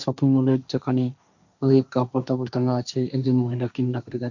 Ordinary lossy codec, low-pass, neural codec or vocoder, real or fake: none; 7.2 kHz; codec, 16 kHz, 1.1 kbps, Voila-Tokenizer; fake